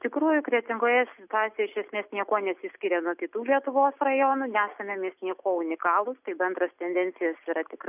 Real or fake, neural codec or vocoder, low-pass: real; none; 3.6 kHz